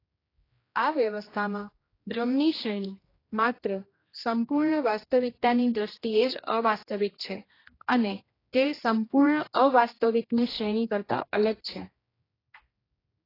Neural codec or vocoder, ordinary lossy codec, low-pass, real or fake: codec, 16 kHz, 1 kbps, X-Codec, HuBERT features, trained on general audio; AAC, 24 kbps; 5.4 kHz; fake